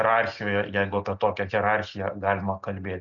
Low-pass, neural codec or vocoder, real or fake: 7.2 kHz; none; real